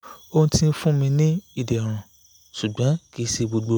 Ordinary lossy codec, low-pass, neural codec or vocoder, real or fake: none; none; none; real